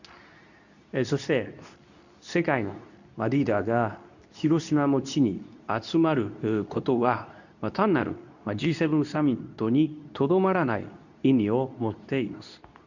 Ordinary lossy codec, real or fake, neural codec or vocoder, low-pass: none; fake; codec, 24 kHz, 0.9 kbps, WavTokenizer, medium speech release version 2; 7.2 kHz